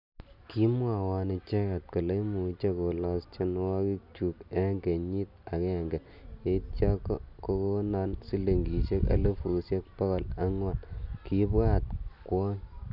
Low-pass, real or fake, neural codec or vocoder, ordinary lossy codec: 5.4 kHz; real; none; none